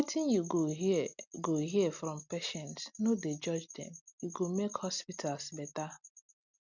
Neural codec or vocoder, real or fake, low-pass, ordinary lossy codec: none; real; 7.2 kHz; none